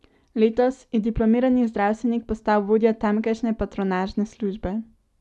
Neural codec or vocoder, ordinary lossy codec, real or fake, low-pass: none; none; real; none